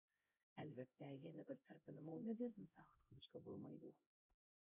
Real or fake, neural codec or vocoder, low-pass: fake; codec, 16 kHz, 0.5 kbps, X-Codec, HuBERT features, trained on LibriSpeech; 3.6 kHz